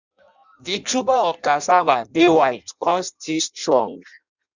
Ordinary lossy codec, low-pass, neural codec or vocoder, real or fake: none; 7.2 kHz; codec, 16 kHz in and 24 kHz out, 0.6 kbps, FireRedTTS-2 codec; fake